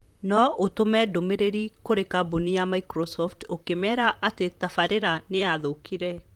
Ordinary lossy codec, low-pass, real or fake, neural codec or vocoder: Opus, 32 kbps; 19.8 kHz; fake; vocoder, 44.1 kHz, 128 mel bands, Pupu-Vocoder